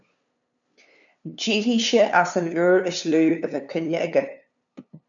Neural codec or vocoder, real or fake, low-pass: codec, 16 kHz, 2 kbps, FunCodec, trained on LibriTTS, 25 frames a second; fake; 7.2 kHz